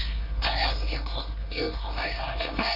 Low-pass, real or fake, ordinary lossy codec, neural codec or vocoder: 5.4 kHz; fake; none; codec, 24 kHz, 1 kbps, SNAC